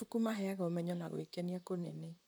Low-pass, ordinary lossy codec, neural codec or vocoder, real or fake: none; none; vocoder, 44.1 kHz, 128 mel bands, Pupu-Vocoder; fake